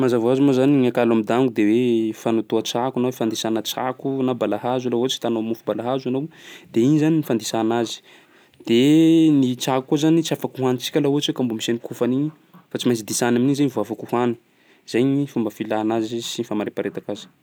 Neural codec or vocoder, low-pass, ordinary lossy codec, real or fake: none; none; none; real